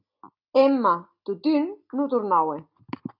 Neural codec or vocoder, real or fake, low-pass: none; real; 5.4 kHz